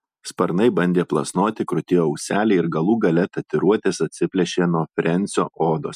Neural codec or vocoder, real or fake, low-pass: none; real; 14.4 kHz